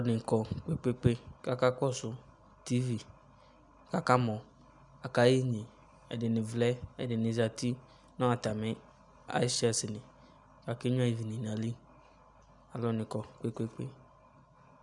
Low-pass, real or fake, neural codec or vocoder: 10.8 kHz; real; none